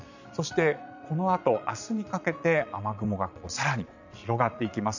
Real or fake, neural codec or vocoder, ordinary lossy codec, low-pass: fake; vocoder, 22.05 kHz, 80 mel bands, Vocos; none; 7.2 kHz